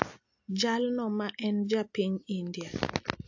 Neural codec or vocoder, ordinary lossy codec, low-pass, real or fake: none; AAC, 48 kbps; 7.2 kHz; real